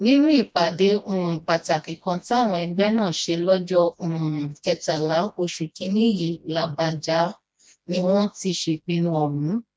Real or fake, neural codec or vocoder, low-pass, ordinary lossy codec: fake; codec, 16 kHz, 1 kbps, FreqCodec, smaller model; none; none